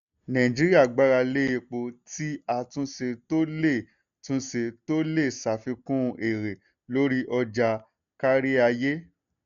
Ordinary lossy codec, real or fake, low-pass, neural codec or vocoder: none; real; 7.2 kHz; none